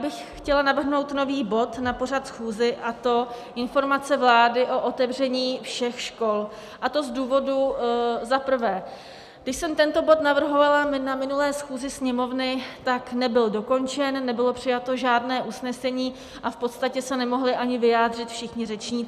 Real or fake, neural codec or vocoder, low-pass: real; none; 14.4 kHz